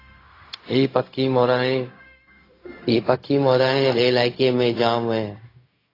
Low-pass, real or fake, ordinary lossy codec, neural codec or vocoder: 5.4 kHz; fake; AAC, 24 kbps; codec, 16 kHz, 0.4 kbps, LongCat-Audio-Codec